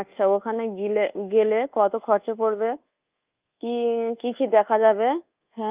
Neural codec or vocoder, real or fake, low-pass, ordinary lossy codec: codec, 24 kHz, 1.2 kbps, DualCodec; fake; 3.6 kHz; Opus, 24 kbps